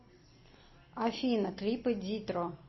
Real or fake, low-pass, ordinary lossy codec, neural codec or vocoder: real; 7.2 kHz; MP3, 24 kbps; none